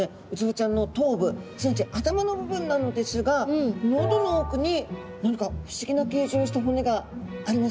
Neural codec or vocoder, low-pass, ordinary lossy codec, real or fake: none; none; none; real